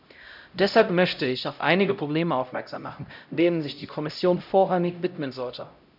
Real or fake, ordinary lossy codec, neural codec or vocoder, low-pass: fake; none; codec, 16 kHz, 0.5 kbps, X-Codec, HuBERT features, trained on LibriSpeech; 5.4 kHz